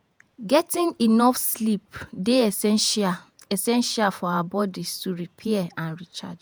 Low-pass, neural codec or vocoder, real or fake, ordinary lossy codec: none; vocoder, 48 kHz, 128 mel bands, Vocos; fake; none